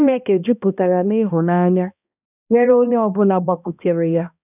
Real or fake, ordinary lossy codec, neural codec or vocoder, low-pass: fake; none; codec, 16 kHz, 1 kbps, X-Codec, HuBERT features, trained on balanced general audio; 3.6 kHz